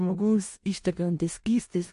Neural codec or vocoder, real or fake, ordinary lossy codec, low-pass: codec, 16 kHz in and 24 kHz out, 0.4 kbps, LongCat-Audio-Codec, four codebook decoder; fake; MP3, 48 kbps; 10.8 kHz